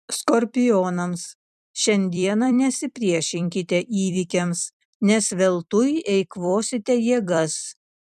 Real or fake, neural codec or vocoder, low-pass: fake; vocoder, 44.1 kHz, 128 mel bands every 256 samples, BigVGAN v2; 14.4 kHz